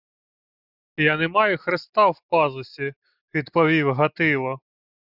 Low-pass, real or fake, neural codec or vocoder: 5.4 kHz; real; none